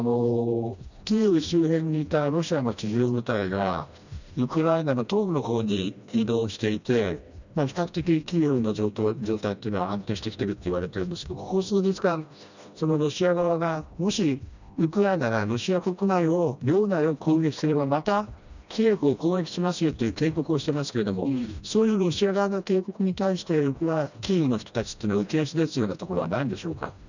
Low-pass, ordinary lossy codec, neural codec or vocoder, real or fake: 7.2 kHz; none; codec, 16 kHz, 1 kbps, FreqCodec, smaller model; fake